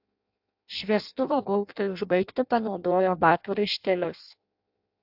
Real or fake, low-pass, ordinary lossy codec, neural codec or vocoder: fake; 5.4 kHz; AAC, 48 kbps; codec, 16 kHz in and 24 kHz out, 0.6 kbps, FireRedTTS-2 codec